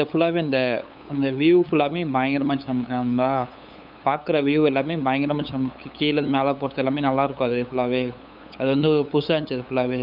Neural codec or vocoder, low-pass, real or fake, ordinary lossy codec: codec, 16 kHz, 8 kbps, FunCodec, trained on LibriTTS, 25 frames a second; 5.4 kHz; fake; none